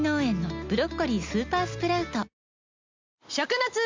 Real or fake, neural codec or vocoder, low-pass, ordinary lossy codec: real; none; 7.2 kHz; MP3, 64 kbps